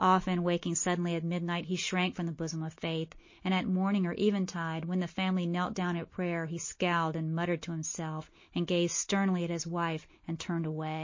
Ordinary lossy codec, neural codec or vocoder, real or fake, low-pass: MP3, 32 kbps; none; real; 7.2 kHz